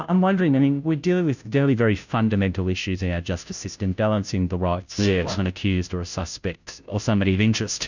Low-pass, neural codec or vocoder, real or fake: 7.2 kHz; codec, 16 kHz, 0.5 kbps, FunCodec, trained on Chinese and English, 25 frames a second; fake